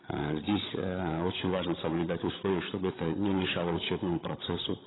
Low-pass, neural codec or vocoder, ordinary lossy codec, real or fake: 7.2 kHz; codec, 16 kHz, 16 kbps, FreqCodec, larger model; AAC, 16 kbps; fake